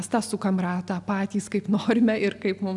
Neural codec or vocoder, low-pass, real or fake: none; 10.8 kHz; real